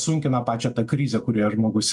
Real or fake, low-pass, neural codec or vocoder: real; 10.8 kHz; none